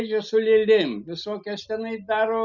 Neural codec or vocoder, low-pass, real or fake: none; 7.2 kHz; real